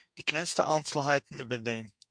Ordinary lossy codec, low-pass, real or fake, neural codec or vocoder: MP3, 64 kbps; 9.9 kHz; fake; codec, 32 kHz, 1.9 kbps, SNAC